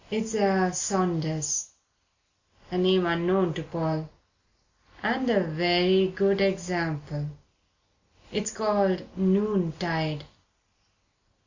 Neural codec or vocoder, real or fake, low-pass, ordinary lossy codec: none; real; 7.2 kHz; Opus, 64 kbps